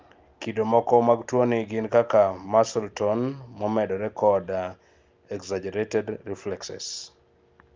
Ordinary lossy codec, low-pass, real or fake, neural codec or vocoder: Opus, 32 kbps; 7.2 kHz; real; none